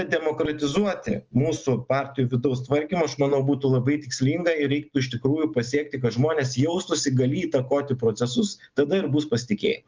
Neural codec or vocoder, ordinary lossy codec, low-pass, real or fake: none; Opus, 24 kbps; 7.2 kHz; real